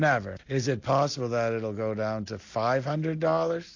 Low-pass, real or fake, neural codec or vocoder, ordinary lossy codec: 7.2 kHz; real; none; AAC, 48 kbps